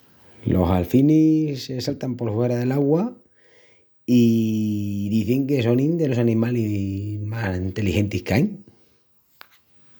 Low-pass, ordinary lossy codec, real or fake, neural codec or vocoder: none; none; real; none